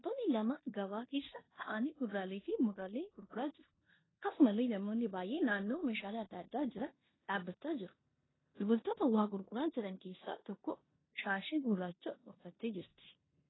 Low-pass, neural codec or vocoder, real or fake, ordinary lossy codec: 7.2 kHz; codec, 16 kHz in and 24 kHz out, 0.9 kbps, LongCat-Audio-Codec, four codebook decoder; fake; AAC, 16 kbps